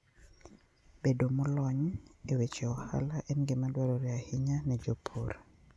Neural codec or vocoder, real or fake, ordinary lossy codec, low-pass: none; real; none; none